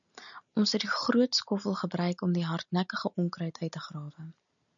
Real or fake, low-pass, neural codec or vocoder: real; 7.2 kHz; none